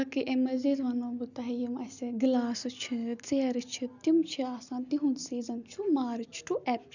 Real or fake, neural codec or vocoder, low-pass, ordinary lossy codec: real; none; 7.2 kHz; none